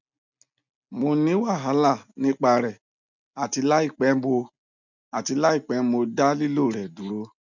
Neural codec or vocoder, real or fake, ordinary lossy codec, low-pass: none; real; none; 7.2 kHz